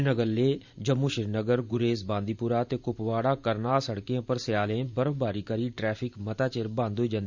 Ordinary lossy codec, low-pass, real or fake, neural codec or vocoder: Opus, 64 kbps; 7.2 kHz; fake; vocoder, 44.1 kHz, 128 mel bands every 512 samples, BigVGAN v2